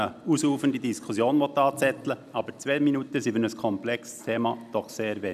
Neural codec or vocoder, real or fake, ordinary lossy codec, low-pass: none; real; none; 14.4 kHz